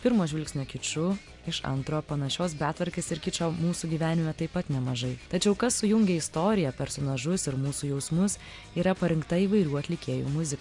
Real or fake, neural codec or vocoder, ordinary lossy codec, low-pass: real; none; MP3, 96 kbps; 10.8 kHz